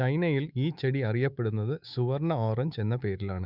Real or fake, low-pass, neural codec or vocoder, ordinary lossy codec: fake; 5.4 kHz; autoencoder, 48 kHz, 128 numbers a frame, DAC-VAE, trained on Japanese speech; none